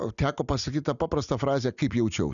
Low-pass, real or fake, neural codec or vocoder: 7.2 kHz; real; none